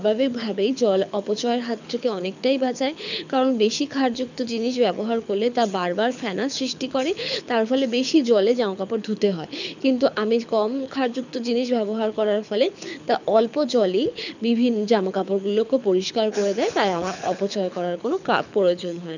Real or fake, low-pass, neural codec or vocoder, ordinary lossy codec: fake; 7.2 kHz; codec, 24 kHz, 6 kbps, HILCodec; none